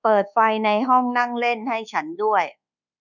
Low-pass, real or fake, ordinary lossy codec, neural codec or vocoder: 7.2 kHz; fake; none; codec, 24 kHz, 1.2 kbps, DualCodec